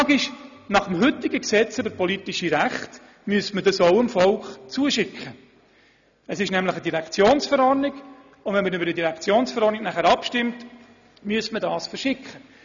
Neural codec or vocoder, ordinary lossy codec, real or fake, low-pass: none; none; real; 7.2 kHz